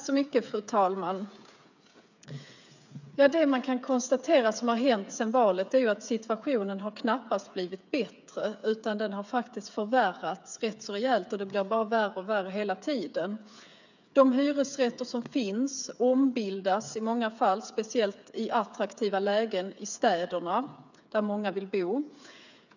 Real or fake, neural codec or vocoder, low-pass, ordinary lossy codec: fake; codec, 16 kHz, 8 kbps, FreqCodec, smaller model; 7.2 kHz; none